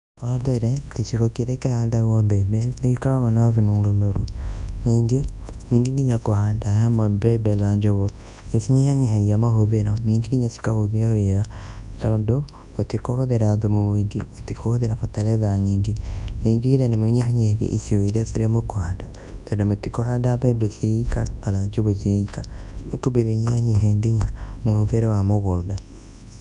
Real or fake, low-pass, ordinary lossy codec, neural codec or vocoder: fake; 10.8 kHz; none; codec, 24 kHz, 0.9 kbps, WavTokenizer, large speech release